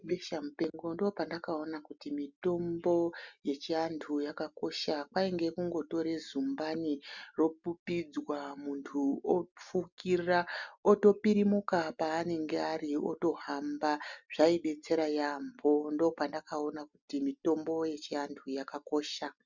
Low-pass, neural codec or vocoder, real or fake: 7.2 kHz; none; real